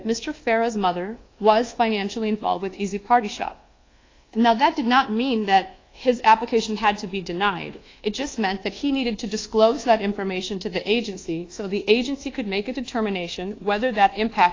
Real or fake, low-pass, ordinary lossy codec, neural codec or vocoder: fake; 7.2 kHz; AAC, 32 kbps; codec, 24 kHz, 1.2 kbps, DualCodec